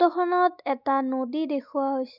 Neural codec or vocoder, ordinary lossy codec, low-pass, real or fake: none; none; 5.4 kHz; real